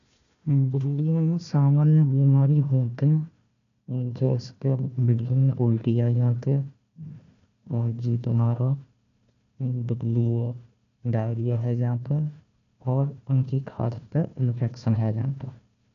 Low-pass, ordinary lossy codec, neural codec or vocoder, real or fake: 7.2 kHz; none; codec, 16 kHz, 1 kbps, FunCodec, trained on Chinese and English, 50 frames a second; fake